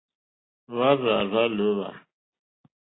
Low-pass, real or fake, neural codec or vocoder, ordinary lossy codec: 7.2 kHz; real; none; AAC, 16 kbps